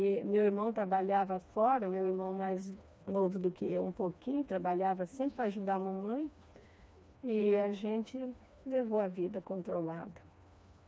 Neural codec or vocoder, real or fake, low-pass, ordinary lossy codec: codec, 16 kHz, 2 kbps, FreqCodec, smaller model; fake; none; none